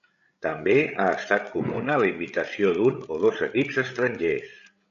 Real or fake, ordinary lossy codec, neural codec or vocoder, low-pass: fake; MP3, 96 kbps; codec, 16 kHz, 16 kbps, FreqCodec, larger model; 7.2 kHz